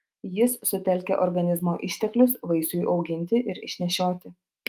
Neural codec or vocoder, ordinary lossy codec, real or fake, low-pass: autoencoder, 48 kHz, 128 numbers a frame, DAC-VAE, trained on Japanese speech; Opus, 32 kbps; fake; 14.4 kHz